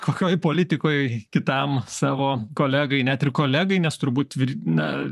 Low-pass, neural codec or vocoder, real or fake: 14.4 kHz; vocoder, 44.1 kHz, 128 mel bands, Pupu-Vocoder; fake